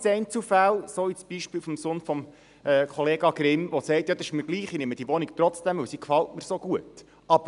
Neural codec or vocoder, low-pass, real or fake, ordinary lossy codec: none; 10.8 kHz; real; none